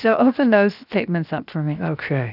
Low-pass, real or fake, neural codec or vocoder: 5.4 kHz; fake; codec, 16 kHz, 0.7 kbps, FocalCodec